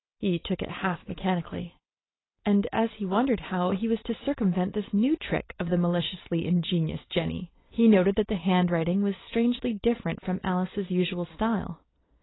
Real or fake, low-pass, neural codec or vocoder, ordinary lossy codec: real; 7.2 kHz; none; AAC, 16 kbps